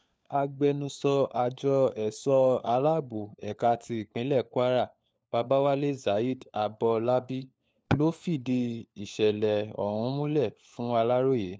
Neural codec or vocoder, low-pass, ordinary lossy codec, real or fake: codec, 16 kHz, 4 kbps, FunCodec, trained on LibriTTS, 50 frames a second; none; none; fake